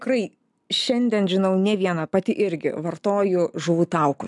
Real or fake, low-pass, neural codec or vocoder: real; 10.8 kHz; none